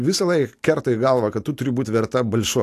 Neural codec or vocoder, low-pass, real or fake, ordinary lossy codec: none; 14.4 kHz; real; AAC, 64 kbps